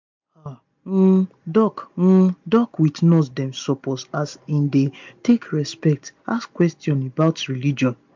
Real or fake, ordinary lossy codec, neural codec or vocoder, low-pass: real; MP3, 64 kbps; none; 7.2 kHz